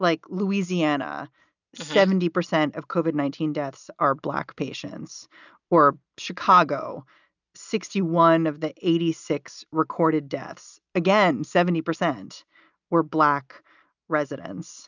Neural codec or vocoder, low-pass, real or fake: none; 7.2 kHz; real